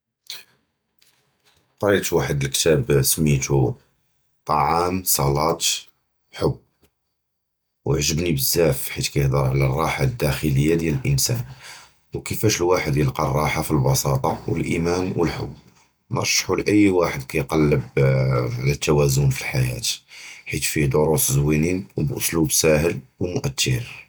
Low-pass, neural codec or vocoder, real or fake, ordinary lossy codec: none; none; real; none